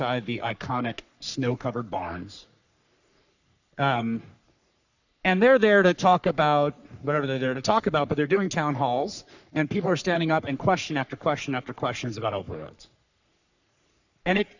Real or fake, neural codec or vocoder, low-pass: fake; codec, 44.1 kHz, 3.4 kbps, Pupu-Codec; 7.2 kHz